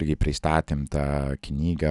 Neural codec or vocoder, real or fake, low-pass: none; real; 10.8 kHz